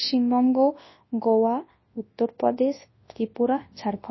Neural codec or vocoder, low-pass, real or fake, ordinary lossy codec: codec, 24 kHz, 0.9 kbps, WavTokenizer, large speech release; 7.2 kHz; fake; MP3, 24 kbps